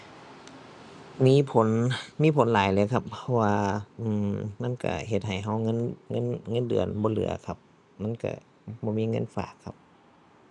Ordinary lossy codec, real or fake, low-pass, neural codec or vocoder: none; real; 10.8 kHz; none